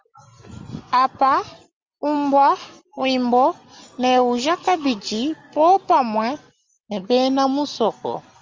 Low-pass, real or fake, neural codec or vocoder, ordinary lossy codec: 7.2 kHz; real; none; Opus, 32 kbps